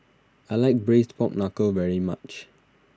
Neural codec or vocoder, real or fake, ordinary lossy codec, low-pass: none; real; none; none